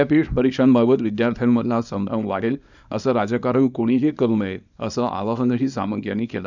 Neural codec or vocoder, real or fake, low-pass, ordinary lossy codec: codec, 24 kHz, 0.9 kbps, WavTokenizer, small release; fake; 7.2 kHz; none